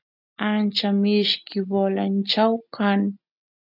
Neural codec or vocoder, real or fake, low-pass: none; real; 5.4 kHz